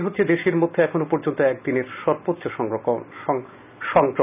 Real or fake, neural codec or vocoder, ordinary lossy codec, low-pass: real; none; none; 3.6 kHz